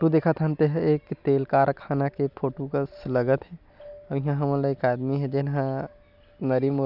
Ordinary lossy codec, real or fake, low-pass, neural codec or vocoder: none; real; 5.4 kHz; none